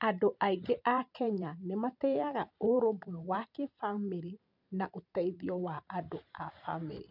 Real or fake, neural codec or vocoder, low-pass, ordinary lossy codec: fake; vocoder, 44.1 kHz, 128 mel bands every 512 samples, BigVGAN v2; 5.4 kHz; none